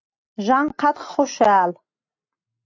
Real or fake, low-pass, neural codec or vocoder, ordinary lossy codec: real; 7.2 kHz; none; AAC, 48 kbps